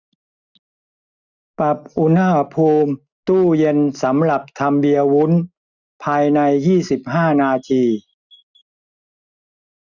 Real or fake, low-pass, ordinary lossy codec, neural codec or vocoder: real; 7.2 kHz; none; none